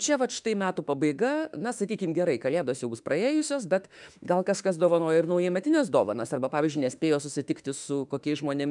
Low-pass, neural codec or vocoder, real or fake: 10.8 kHz; autoencoder, 48 kHz, 32 numbers a frame, DAC-VAE, trained on Japanese speech; fake